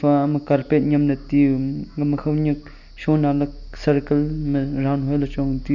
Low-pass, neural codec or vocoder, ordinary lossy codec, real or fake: 7.2 kHz; none; none; real